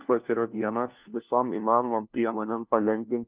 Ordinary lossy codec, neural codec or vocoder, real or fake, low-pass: Opus, 24 kbps; codec, 16 kHz, 1 kbps, FunCodec, trained on LibriTTS, 50 frames a second; fake; 3.6 kHz